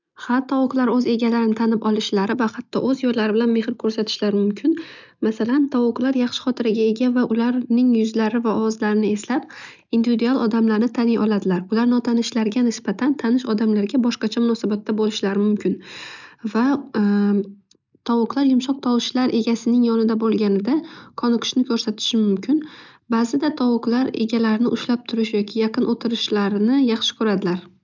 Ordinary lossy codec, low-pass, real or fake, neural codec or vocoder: none; 7.2 kHz; real; none